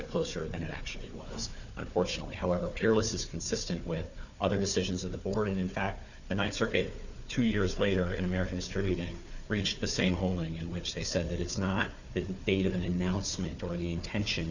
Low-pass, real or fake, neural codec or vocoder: 7.2 kHz; fake; codec, 16 kHz, 4 kbps, FunCodec, trained on Chinese and English, 50 frames a second